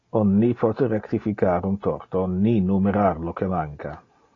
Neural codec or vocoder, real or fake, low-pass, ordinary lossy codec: none; real; 7.2 kHz; AAC, 32 kbps